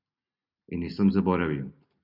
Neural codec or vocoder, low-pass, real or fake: none; 5.4 kHz; real